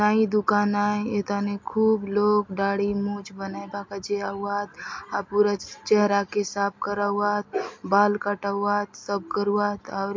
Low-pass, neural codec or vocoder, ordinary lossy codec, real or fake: 7.2 kHz; none; MP3, 48 kbps; real